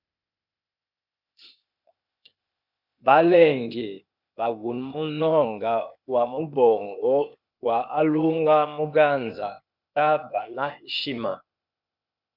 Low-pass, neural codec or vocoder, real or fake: 5.4 kHz; codec, 16 kHz, 0.8 kbps, ZipCodec; fake